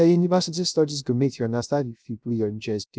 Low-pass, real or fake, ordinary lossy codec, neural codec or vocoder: none; fake; none; codec, 16 kHz, 0.3 kbps, FocalCodec